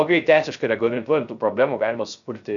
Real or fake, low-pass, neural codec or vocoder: fake; 7.2 kHz; codec, 16 kHz, 0.3 kbps, FocalCodec